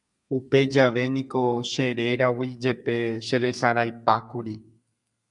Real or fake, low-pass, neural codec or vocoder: fake; 10.8 kHz; codec, 32 kHz, 1.9 kbps, SNAC